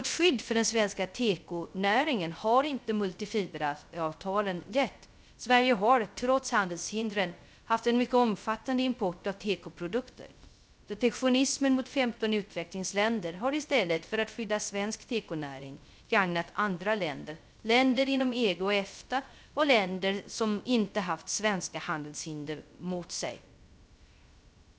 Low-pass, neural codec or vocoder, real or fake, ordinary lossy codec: none; codec, 16 kHz, 0.3 kbps, FocalCodec; fake; none